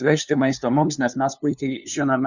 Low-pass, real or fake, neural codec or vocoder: 7.2 kHz; fake; codec, 16 kHz, 2 kbps, FunCodec, trained on LibriTTS, 25 frames a second